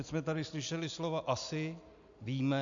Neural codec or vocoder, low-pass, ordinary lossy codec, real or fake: none; 7.2 kHz; AAC, 64 kbps; real